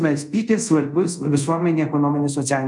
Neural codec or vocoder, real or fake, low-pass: codec, 24 kHz, 0.5 kbps, DualCodec; fake; 10.8 kHz